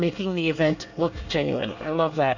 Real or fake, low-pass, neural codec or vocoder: fake; 7.2 kHz; codec, 24 kHz, 1 kbps, SNAC